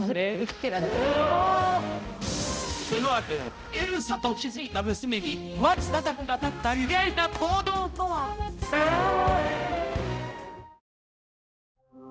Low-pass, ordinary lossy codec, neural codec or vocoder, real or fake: none; none; codec, 16 kHz, 0.5 kbps, X-Codec, HuBERT features, trained on balanced general audio; fake